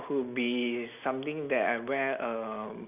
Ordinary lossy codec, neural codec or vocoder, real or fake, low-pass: none; none; real; 3.6 kHz